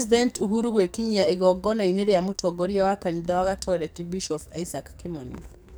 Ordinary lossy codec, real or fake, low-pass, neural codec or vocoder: none; fake; none; codec, 44.1 kHz, 2.6 kbps, SNAC